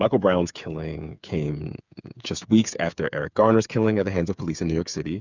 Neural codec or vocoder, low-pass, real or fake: codec, 16 kHz, 8 kbps, FreqCodec, smaller model; 7.2 kHz; fake